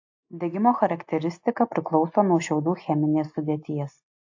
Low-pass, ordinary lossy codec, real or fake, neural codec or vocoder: 7.2 kHz; AAC, 48 kbps; real; none